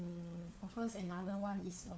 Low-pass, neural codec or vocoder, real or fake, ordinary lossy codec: none; codec, 16 kHz, 4 kbps, FunCodec, trained on LibriTTS, 50 frames a second; fake; none